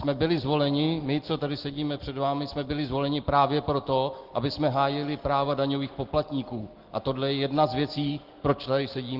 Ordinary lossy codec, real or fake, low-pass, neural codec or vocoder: Opus, 16 kbps; real; 5.4 kHz; none